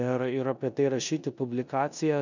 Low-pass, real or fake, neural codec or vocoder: 7.2 kHz; fake; codec, 16 kHz in and 24 kHz out, 0.9 kbps, LongCat-Audio-Codec, four codebook decoder